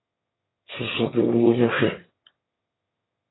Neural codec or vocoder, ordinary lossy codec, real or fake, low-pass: autoencoder, 22.05 kHz, a latent of 192 numbers a frame, VITS, trained on one speaker; AAC, 16 kbps; fake; 7.2 kHz